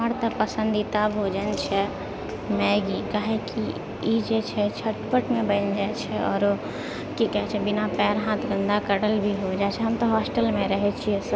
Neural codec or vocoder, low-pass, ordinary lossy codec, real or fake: none; none; none; real